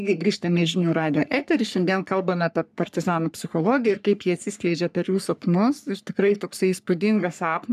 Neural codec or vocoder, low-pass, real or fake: codec, 44.1 kHz, 3.4 kbps, Pupu-Codec; 14.4 kHz; fake